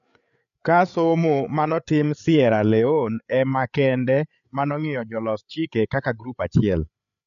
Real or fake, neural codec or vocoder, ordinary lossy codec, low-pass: fake; codec, 16 kHz, 8 kbps, FreqCodec, larger model; none; 7.2 kHz